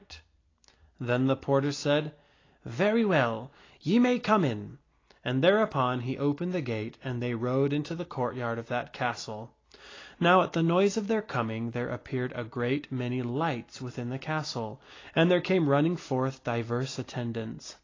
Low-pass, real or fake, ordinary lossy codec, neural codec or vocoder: 7.2 kHz; real; AAC, 32 kbps; none